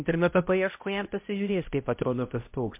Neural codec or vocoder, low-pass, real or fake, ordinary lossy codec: codec, 16 kHz, 0.5 kbps, X-Codec, HuBERT features, trained on balanced general audio; 3.6 kHz; fake; MP3, 32 kbps